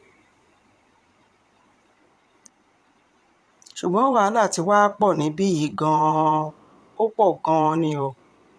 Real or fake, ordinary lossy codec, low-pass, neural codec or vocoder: fake; none; none; vocoder, 22.05 kHz, 80 mel bands, Vocos